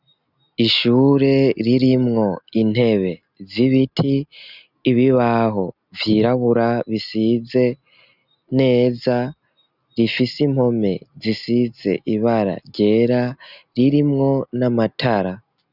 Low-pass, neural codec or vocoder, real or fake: 5.4 kHz; none; real